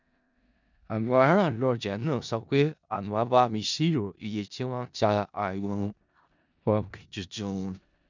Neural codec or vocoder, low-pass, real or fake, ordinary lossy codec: codec, 16 kHz in and 24 kHz out, 0.4 kbps, LongCat-Audio-Codec, four codebook decoder; 7.2 kHz; fake; none